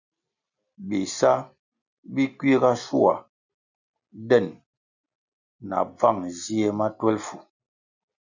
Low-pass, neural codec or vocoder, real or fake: 7.2 kHz; none; real